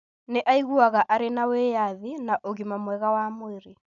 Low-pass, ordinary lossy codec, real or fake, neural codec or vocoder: 7.2 kHz; none; real; none